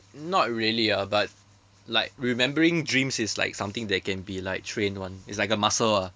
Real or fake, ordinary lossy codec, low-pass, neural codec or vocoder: real; none; none; none